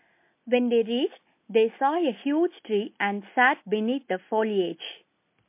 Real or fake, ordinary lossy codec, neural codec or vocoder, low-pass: real; MP3, 24 kbps; none; 3.6 kHz